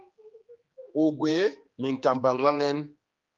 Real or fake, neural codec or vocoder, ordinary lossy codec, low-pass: fake; codec, 16 kHz, 2 kbps, X-Codec, HuBERT features, trained on general audio; Opus, 32 kbps; 7.2 kHz